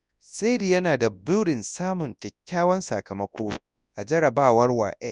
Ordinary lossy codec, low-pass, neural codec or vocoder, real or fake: none; 10.8 kHz; codec, 24 kHz, 0.9 kbps, WavTokenizer, large speech release; fake